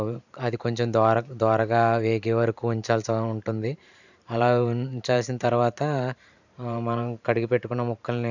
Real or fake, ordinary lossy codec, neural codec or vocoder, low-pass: fake; none; vocoder, 44.1 kHz, 128 mel bands every 512 samples, BigVGAN v2; 7.2 kHz